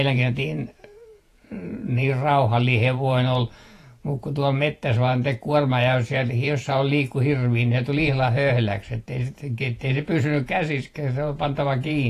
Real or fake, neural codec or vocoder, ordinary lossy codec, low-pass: fake; vocoder, 48 kHz, 128 mel bands, Vocos; AAC, 48 kbps; 14.4 kHz